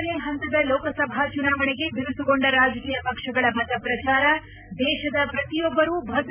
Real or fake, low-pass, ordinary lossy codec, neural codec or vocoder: real; 3.6 kHz; none; none